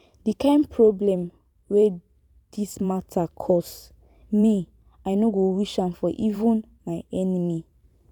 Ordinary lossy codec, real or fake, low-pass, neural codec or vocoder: none; fake; 19.8 kHz; vocoder, 44.1 kHz, 128 mel bands every 512 samples, BigVGAN v2